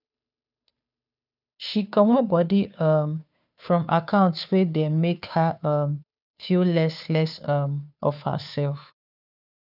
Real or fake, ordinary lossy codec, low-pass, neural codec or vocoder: fake; none; 5.4 kHz; codec, 16 kHz, 2 kbps, FunCodec, trained on Chinese and English, 25 frames a second